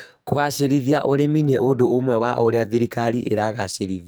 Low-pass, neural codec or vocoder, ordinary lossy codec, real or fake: none; codec, 44.1 kHz, 2.6 kbps, SNAC; none; fake